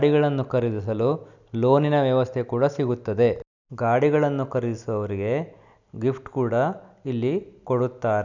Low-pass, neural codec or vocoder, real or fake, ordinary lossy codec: 7.2 kHz; none; real; none